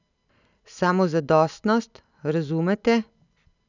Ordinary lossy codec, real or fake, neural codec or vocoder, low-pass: none; real; none; 7.2 kHz